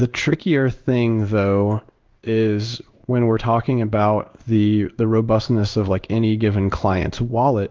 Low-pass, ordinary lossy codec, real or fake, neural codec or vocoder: 7.2 kHz; Opus, 32 kbps; fake; codec, 16 kHz in and 24 kHz out, 1 kbps, XY-Tokenizer